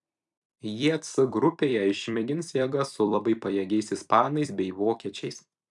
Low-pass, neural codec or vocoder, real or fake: 10.8 kHz; vocoder, 44.1 kHz, 128 mel bands every 256 samples, BigVGAN v2; fake